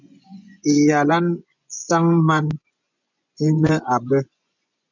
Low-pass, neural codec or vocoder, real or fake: 7.2 kHz; vocoder, 24 kHz, 100 mel bands, Vocos; fake